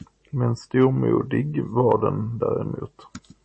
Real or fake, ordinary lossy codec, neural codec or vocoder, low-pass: real; MP3, 32 kbps; none; 9.9 kHz